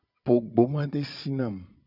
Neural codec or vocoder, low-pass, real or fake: vocoder, 22.05 kHz, 80 mel bands, Vocos; 5.4 kHz; fake